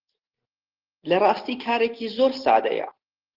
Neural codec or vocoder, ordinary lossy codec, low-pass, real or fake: none; Opus, 16 kbps; 5.4 kHz; real